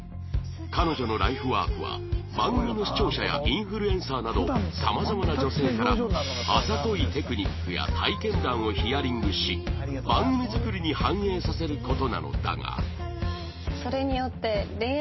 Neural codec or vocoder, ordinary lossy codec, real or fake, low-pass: none; MP3, 24 kbps; real; 7.2 kHz